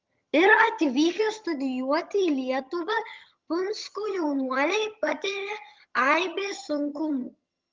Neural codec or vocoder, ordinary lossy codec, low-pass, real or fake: vocoder, 22.05 kHz, 80 mel bands, HiFi-GAN; Opus, 24 kbps; 7.2 kHz; fake